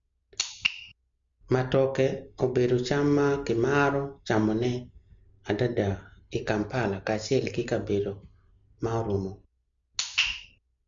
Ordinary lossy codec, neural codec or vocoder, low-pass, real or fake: MP3, 64 kbps; none; 7.2 kHz; real